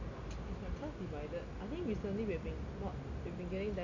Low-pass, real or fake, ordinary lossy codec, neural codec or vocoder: 7.2 kHz; real; none; none